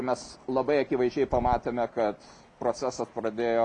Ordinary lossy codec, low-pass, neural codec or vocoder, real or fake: MP3, 96 kbps; 10.8 kHz; none; real